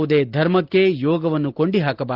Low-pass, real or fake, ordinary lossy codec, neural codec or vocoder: 5.4 kHz; real; Opus, 16 kbps; none